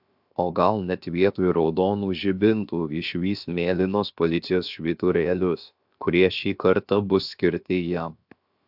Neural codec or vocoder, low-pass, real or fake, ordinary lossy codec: codec, 16 kHz, 0.7 kbps, FocalCodec; 5.4 kHz; fake; AAC, 48 kbps